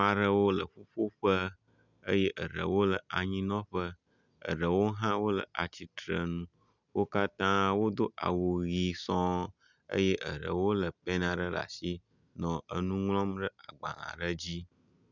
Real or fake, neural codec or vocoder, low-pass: real; none; 7.2 kHz